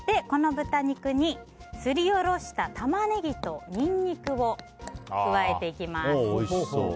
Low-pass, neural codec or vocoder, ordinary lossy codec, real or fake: none; none; none; real